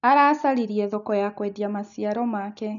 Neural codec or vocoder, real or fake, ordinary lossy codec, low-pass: none; real; none; 7.2 kHz